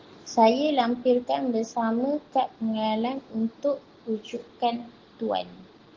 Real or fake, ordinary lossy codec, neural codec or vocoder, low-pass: real; Opus, 16 kbps; none; 7.2 kHz